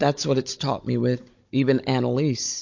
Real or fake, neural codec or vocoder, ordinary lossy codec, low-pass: fake; codec, 16 kHz, 16 kbps, FunCodec, trained on Chinese and English, 50 frames a second; MP3, 48 kbps; 7.2 kHz